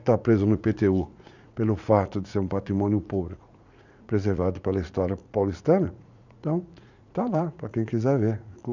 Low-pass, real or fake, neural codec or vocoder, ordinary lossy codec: 7.2 kHz; fake; vocoder, 44.1 kHz, 80 mel bands, Vocos; none